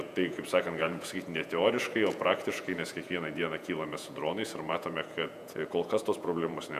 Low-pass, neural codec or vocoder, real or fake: 14.4 kHz; vocoder, 48 kHz, 128 mel bands, Vocos; fake